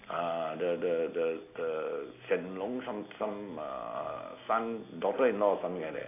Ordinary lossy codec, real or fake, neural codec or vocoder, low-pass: AAC, 24 kbps; real; none; 3.6 kHz